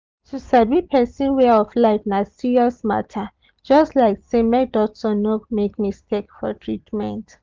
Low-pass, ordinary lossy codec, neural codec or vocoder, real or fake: 7.2 kHz; Opus, 32 kbps; none; real